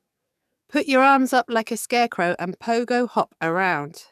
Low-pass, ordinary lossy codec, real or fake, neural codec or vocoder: 14.4 kHz; none; fake; codec, 44.1 kHz, 7.8 kbps, DAC